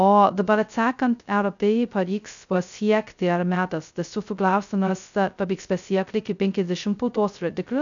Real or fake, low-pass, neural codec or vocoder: fake; 7.2 kHz; codec, 16 kHz, 0.2 kbps, FocalCodec